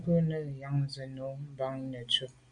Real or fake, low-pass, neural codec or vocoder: real; 9.9 kHz; none